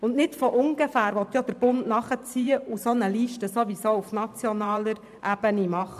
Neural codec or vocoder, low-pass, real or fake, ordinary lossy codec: vocoder, 44.1 kHz, 128 mel bands every 512 samples, BigVGAN v2; 14.4 kHz; fake; AAC, 96 kbps